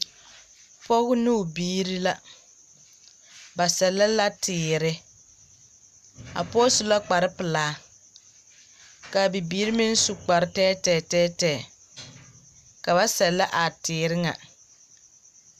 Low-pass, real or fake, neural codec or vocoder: 14.4 kHz; real; none